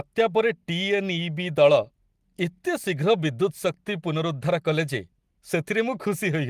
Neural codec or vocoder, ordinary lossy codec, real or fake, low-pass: none; Opus, 24 kbps; real; 14.4 kHz